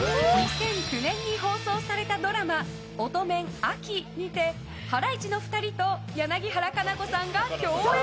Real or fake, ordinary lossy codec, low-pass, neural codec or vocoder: real; none; none; none